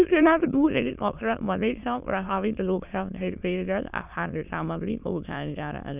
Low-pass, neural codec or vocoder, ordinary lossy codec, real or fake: 3.6 kHz; autoencoder, 22.05 kHz, a latent of 192 numbers a frame, VITS, trained on many speakers; none; fake